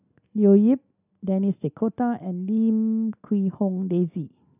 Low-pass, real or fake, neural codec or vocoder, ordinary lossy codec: 3.6 kHz; real; none; none